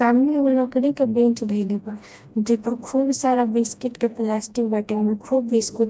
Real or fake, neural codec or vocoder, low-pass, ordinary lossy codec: fake; codec, 16 kHz, 1 kbps, FreqCodec, smaller model; none; none